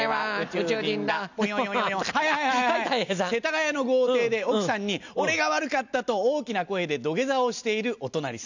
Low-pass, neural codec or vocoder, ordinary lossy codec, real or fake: 7.2 kHz; none; none; real